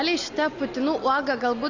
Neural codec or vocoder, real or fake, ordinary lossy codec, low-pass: none; real; AAC, 48 kbps; 7.2 kHz